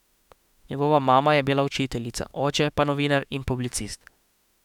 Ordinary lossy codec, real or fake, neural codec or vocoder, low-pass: none; fake; autoencoder, 48 kHz, 32 numbers a frame, DAC-VAE, trained on Japanese speech; 19.8 kHz